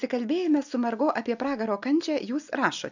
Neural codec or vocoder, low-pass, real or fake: none; 7.2 kHz; real